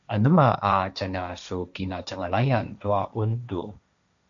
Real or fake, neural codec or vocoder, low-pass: fake; codec, 16 kHz, 1.1 kbps, Voila-Tokenizer; 7.2 kHz